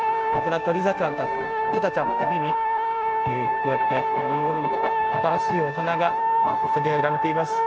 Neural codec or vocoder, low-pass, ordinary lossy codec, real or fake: codec, 16 kHz, 0.9 kbps, LongCat-Audio-Codec; none; none; fake